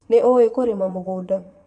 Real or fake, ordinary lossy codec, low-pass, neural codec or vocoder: fake; none; 9.9 kHz; vocoder, 22.05 kHz, 80 mel bands, Vocos